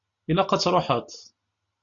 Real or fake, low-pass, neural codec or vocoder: real; 7.2 kHz; none